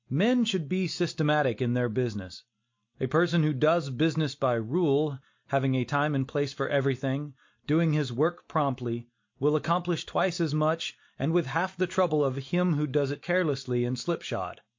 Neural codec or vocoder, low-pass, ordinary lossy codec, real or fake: none; 7.2 kHz; MP3, 48 kbps; real